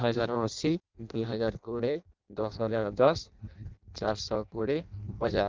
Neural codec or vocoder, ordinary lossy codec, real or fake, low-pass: codec, 16 kHz in and 24 kHz out, 0.6 kbps, FireRedTTS-2 codec; Opus, 24 kbps; fake; 7.2 kHz